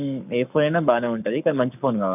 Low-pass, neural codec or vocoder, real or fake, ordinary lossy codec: 3.6 kHz; codec, 44.1 kHz, 7.8 kbps, Pupu-Codec; fake; none